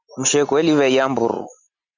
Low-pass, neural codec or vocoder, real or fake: 7.2 kHz; none; real